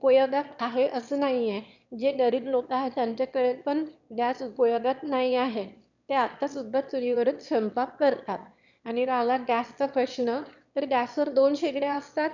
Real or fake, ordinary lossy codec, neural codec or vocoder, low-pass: fake; none; autoencoder, 22.05 kHz, a latent of 192 numbers a frame, VITS, trained on one speaker; 7.2 kHz